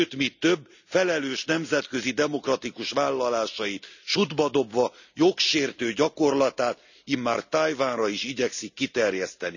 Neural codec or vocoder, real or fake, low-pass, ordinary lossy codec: none; real; 7.2 kHz; none